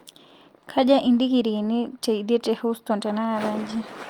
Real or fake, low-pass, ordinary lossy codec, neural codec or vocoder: real; 19.8 kHz; Opus, 32 kbps; none